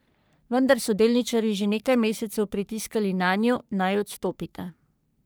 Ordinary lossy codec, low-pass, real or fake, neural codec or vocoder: none; none; fake; codec, 44.1 kHz, 3.4 kbps, Pupu-Codec